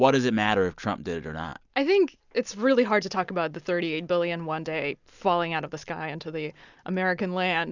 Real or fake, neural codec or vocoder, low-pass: real; none; 7.2 kHz